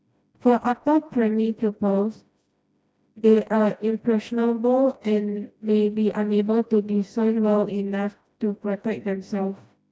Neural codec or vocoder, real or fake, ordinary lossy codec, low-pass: codec, 16 kHz, 1 kbps, FreqCodec, smaller model; fake; none; none